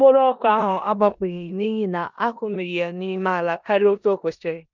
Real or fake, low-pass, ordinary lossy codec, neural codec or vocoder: fake; 7.2 kHz; none; codec, 16 kHz in and 24 kHz out, 0.9 kbps, LongCat-Audio-Codec, four codebook decoder